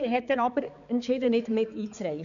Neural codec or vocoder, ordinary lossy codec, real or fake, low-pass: codec, 16 kHz, 4 kbps, X-Codec, HuBERT features, trained on balanced general audio; none; fake; 7.2 kHz